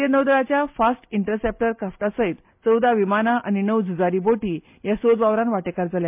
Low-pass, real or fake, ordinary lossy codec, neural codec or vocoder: 3.6 kHz; real; MP3, 32 kbps; none